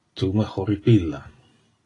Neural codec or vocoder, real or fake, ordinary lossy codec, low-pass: none; real; AAC, 32 kbps; 10.8 kHz